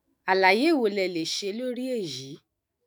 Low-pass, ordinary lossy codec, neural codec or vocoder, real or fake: none; none; autoencoder, 48 kHz, 128 numbers a frame, DAC-VAE, trained on Japanese speech; fake